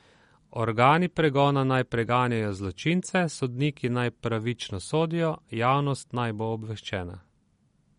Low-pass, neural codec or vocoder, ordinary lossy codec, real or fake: 10.8 kHz; none; MP3, 48 kbps; real